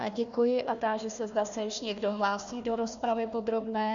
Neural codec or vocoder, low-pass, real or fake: codec, 16 kHz, 1 kbps, FunCodec, trained on Chinese and English, 50 frames a second; 7.2 kHz; fake